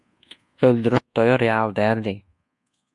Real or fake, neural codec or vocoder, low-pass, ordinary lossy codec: fake; codec, 24 kHz, 1.2 kbps, DualCodec; 10.8 kHz; MP3, 64 kbps